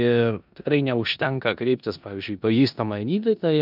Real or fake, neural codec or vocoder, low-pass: fake; codec, 16 kHz in and 24 kHz out, 0.9 kbps, LongCat-Audio-Codec, four codebook decoder; 5.4 kHz